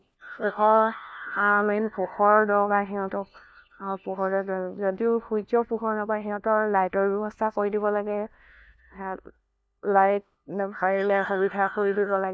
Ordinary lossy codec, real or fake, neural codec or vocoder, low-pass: none; fake; codec, 16 kHz, 1 kbps, FunCodec, trained on LibriTTS, 50 frames a second; none